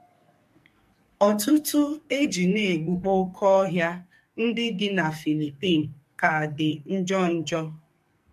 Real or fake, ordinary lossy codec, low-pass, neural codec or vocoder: fake; MP3, 64 kbps; 14.4 kHz; codec, 44.1 kHz, 2.6 kbps, SNAC